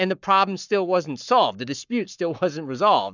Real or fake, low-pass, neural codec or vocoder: fake; 7.2 kHz; codec, 44.1 kHz, 7.8 kbps, Pupu-Codec